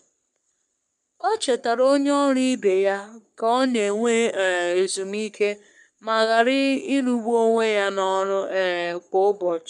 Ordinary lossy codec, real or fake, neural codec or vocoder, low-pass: none; fake; codec, 44.1 kHz, 3.4 kbps, Pupu-Codec; 10.8 kHz